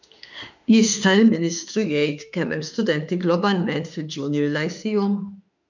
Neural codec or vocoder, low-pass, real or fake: autoencoder, 48 kHz, 32 numbers a frame, DAC-VAE, trained on Japanese speech; 7.2 kHz; fake